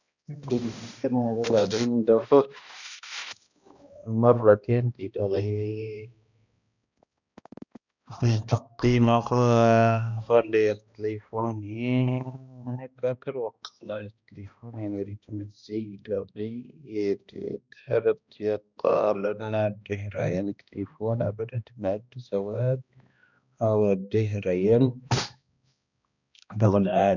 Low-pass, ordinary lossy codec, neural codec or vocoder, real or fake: 7.2 kHz; none; codec, 16 kHz, 1 kbps, X-Codec, HuBERT features, trained on balanced general audio; fake